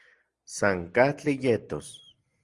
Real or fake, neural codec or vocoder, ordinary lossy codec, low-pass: real; none; Opus, 24 kbps; 10.8 kHz